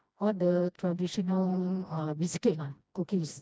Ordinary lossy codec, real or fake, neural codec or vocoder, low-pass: none; fake; codec, 16 kHz, 1 kbps, FreqCodec, smaller model; none